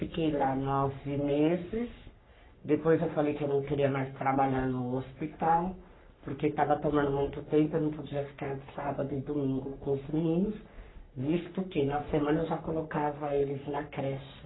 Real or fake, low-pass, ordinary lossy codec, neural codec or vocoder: fake; 7.2 kHz; AAC, 16 kbps; codec, 44.1 kHz, 3.4 kbps, Pupu-Codec